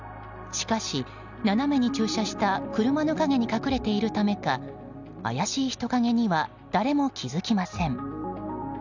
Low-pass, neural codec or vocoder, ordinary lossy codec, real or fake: 7.2 kHz; none; none; real